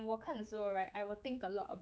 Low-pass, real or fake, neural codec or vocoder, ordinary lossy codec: none; fake; codec, 16 kHz, 4 kbps, X-Codec, HuBERT features, trained on general audio; none